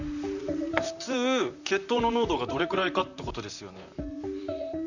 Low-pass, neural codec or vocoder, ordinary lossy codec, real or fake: 7.2 kHz; vocoder, 44.1 kHz, 128 mel bands, Pupu-Vocoder; none; fake